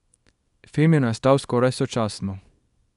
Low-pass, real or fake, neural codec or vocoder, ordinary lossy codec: 10.8 kHz; fake; codec, 24 kHz, 0.9 kbps, WavTokenizer, small release; none